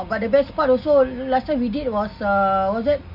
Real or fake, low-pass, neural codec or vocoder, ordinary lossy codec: real; 5.4 kHz; none; none